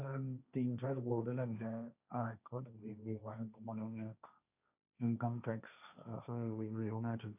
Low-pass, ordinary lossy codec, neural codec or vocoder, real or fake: 3.6 kHz; none; codec, 16 kHz, 1.1 kbps, Voila-Tokenizer; fake